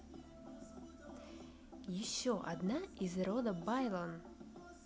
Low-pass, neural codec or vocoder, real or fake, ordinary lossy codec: none; none; real; none